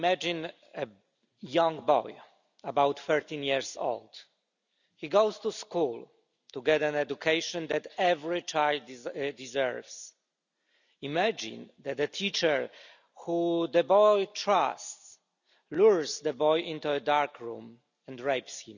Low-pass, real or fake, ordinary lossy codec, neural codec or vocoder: 7.2 kHz; real; none; none